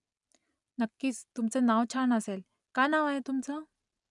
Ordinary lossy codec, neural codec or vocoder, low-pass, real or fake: none; none; 10.8 kHz; real